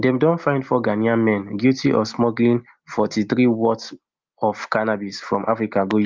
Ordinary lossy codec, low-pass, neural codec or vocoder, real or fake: Opus, 32 kbps; 7.2 kHz; none; real